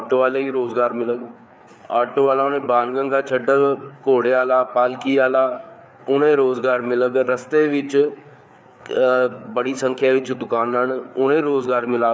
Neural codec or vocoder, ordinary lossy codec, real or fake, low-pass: codec, 16 kHz, 4 kbps, FreqCodec, larger model; none; fake; none